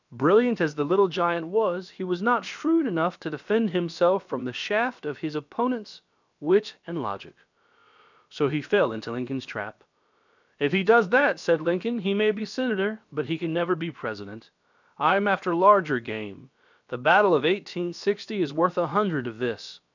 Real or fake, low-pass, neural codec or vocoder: fake; 7.2 kHz; codec, 16 kHz, about 1 kbps, DyCAST, with the encoder's durations